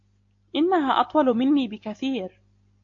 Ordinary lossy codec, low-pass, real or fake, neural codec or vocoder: AAC, 48 kbps; 7.2 kHz; real; none